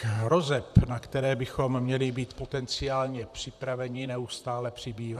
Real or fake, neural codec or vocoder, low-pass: fake; vocoder, 44.1 kHz, 128 mel bands, Pupu-Vocoder; 14.4 kHz